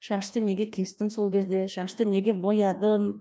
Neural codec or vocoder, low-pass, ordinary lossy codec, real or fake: codec, 16 kHz, 1 kbps, FreqCodec, larger model; none; none; fake